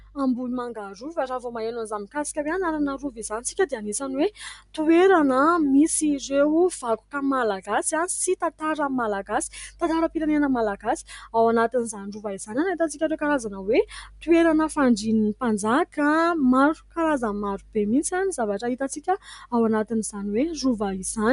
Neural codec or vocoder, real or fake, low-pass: none; real; 10.8 kHz